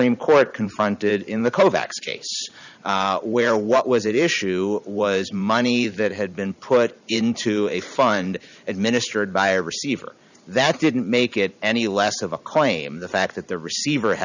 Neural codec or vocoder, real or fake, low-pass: vocoder, 44.1 kHz, 128 mel bands every 512 samples, BigVGAN v2; fake; 7.2 kHz